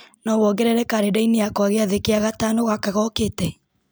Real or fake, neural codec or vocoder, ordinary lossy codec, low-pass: real; none; none; none